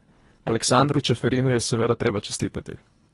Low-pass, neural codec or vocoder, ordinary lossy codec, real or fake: 10.8 kHz; codec, 24 kHz, 1.5 kbps, HILCodec; AAC, 32 kbps; fake